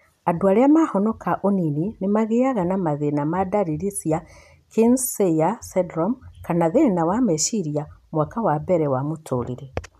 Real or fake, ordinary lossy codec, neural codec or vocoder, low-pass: real; none; none; 14.4 kHz